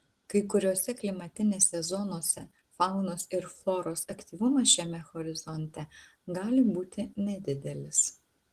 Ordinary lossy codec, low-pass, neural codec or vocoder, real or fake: Opus, 16 kbps; 14.4 kHz; none; real